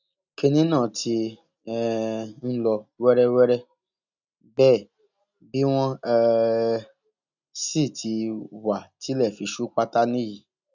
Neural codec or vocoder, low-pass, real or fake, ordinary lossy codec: none; 7.2 kHz; real; none